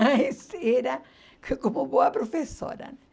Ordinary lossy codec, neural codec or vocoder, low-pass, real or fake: none; none; none; real